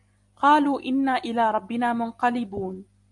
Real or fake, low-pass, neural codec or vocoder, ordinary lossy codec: real; 10.8 kHz; none; MP3, 64 kbps